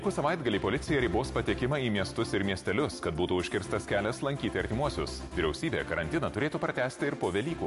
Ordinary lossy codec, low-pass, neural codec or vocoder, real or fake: MP3, 48 kbps; 14.4 kHz; none; real